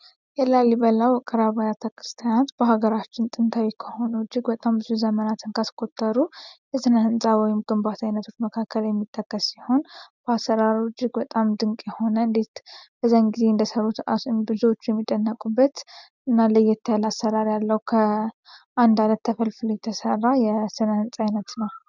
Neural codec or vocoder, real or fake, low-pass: none; real; 7.2 kHz